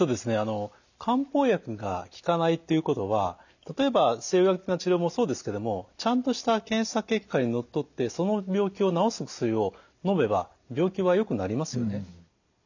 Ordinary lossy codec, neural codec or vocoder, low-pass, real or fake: none; none; 7.2 kHz; real